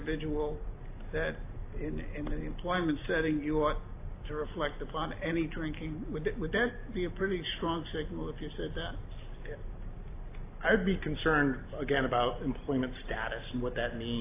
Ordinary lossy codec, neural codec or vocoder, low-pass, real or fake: AAC, 24 kbps; none; 3.6 kHz; real